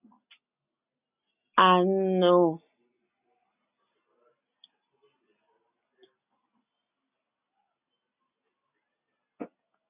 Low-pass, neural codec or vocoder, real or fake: 3.6 kHz; none; real